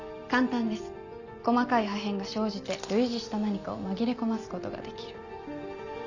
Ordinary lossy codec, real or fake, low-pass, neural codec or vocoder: none; real; 7.2 kHz; none